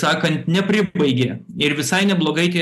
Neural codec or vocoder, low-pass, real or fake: none; 14.4 kHz; real